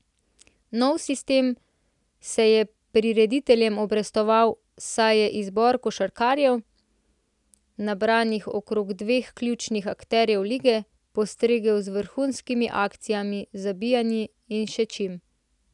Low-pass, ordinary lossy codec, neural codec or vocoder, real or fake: 10.8 kHz; none; none; real